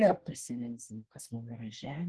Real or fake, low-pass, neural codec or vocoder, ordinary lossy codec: fake; 10.8 kHz; codec, 24 kHz, 1 kbps, SNAC; Opus, 16 kbps